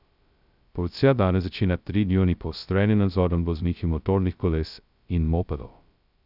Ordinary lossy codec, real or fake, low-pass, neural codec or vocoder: none; fake; 5.4 kHz; codec, 16 kHz, 0.2 kbps, FocalCodec